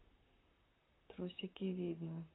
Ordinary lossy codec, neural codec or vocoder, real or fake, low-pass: AAC, 16 kbps; vocoder, 44.1 kHz, 128 mel bands, Pupu-Vocoder; fake; 7.2 kHz